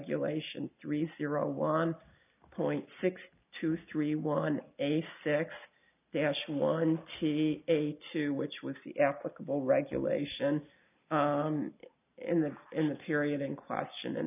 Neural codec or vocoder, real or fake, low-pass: none; real; 3.6 kHz